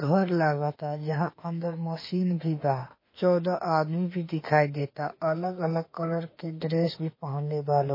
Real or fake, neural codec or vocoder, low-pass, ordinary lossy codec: fake; autoencoder, 48 kHz, 32 numbers a frame, DAC-VAE, trained on Japanese speech; 5.4 kHz; MP3, 24 kbps